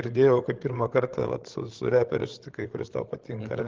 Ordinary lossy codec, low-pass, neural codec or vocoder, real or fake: Opus, 16 kbps; 7.2 kHz; codec, 16 kHz, 8 kbps, FreqCodec, larger model; fake